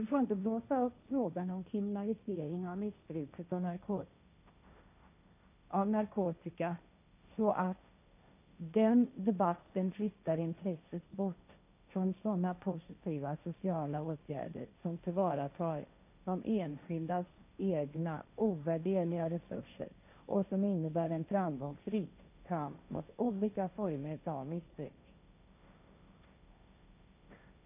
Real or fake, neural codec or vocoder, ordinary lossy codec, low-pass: fake; codec, 16 kHz, 1.1 kbps, Voila-Tokenizer; none; 3.6 kHz